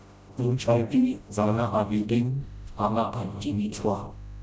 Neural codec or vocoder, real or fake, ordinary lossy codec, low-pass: codec, 16 kHz, 0.5 kbps, FreqCodec, smaller model; fake; none; none